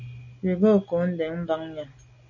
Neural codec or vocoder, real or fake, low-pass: none; real; 7.2 kHz